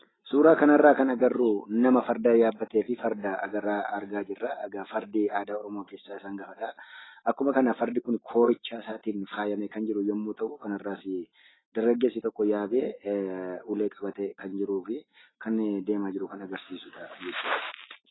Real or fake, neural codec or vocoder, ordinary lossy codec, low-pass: real; none; AAC, 16 kbps; 7.2 kHz